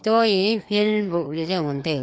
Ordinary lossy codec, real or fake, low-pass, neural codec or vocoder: none; fake; none; codec, 16 kHz, 2 kbps, FreqCodec, larger model